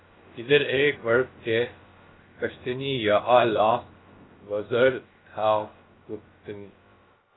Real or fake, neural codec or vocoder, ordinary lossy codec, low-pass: fake; codec, 16 kHz, about 1 kbps, DyCAST, with the encoder's durations; AAC, 16 kbps; 7.2 kHz